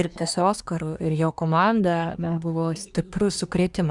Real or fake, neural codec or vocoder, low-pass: fake; codec, 24 kHz, 1 kbps, SNAC; 10.8 kHz